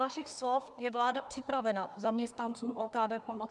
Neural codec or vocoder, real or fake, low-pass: codec, 24 kHz, 1 kbps, SNAC; fake; 10.8 kHz